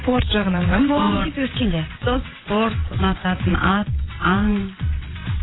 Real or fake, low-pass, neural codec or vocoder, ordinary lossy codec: fake; 7.2 kHz; vocoder, 22.05 kHz, 80 mel bands, WaveNeXt; AAC, 16 kbps